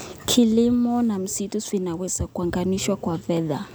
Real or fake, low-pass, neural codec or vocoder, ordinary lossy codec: real; none; none; none